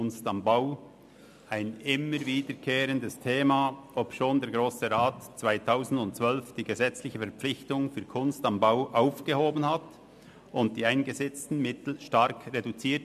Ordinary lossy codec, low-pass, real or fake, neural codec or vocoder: MP3, 96 kbps; 14.4 kHz; real; none